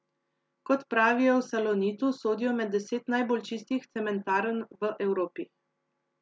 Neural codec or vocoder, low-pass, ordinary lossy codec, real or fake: none; none; none; real